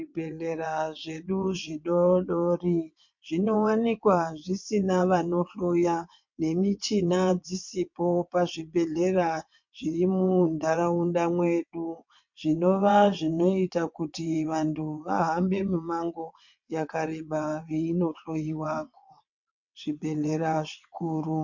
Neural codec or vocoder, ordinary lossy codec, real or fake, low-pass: vocoder, 22.05 kHz, 80 mel bands, WaveNeXt; MP3, 48 kbps; fake; 7.2 kHz